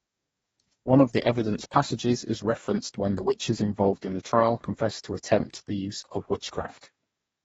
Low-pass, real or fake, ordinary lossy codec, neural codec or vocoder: 19.8 kHz; fake; AAC, 24 kbps; codec, 44.1 kHz, 2.6 kbps, DAC